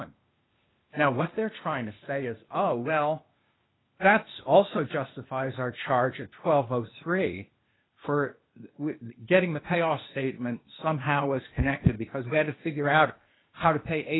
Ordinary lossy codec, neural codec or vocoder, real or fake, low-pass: AAC, 16 kbps; codec, 16 kHz, 0.8 kbps, ZipCodec; fake; 7.2 kHz